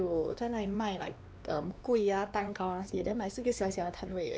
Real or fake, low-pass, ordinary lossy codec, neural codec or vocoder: fake; none; none; codec, 16 kHz, 2 kbps, X-Codec, WavLM features, trained on Multilingual LibriSpeech